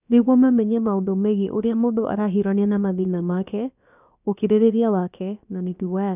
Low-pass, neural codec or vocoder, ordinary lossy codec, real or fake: 3.6 kHz; codec, 16 kHz, about 1 kbps, DyCAST, with the encoder's durations; none; fake